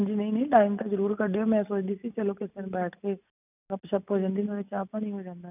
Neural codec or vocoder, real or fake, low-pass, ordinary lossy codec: none; real; 3.6 kHz; none